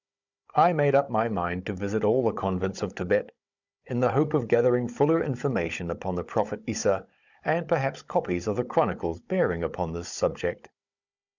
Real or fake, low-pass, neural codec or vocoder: fake; 7.2 kHz; codec, 16 kHz, 16 kbps, FunCodec, trained on Chinese and English, 50 frames a second